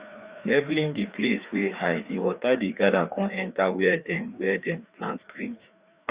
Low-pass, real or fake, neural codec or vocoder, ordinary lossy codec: 3.6 kHz; fake; codec, 16 kHz, 2 kbps, FreqCodec, larger model; Opus, 64 kbps